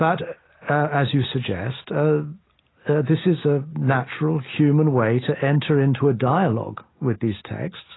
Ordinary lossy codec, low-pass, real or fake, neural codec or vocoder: AAC, 16 kbps; 7.2 kHz; real; none